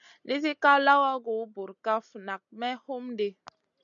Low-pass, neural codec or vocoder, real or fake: 7.2 kHz; none; real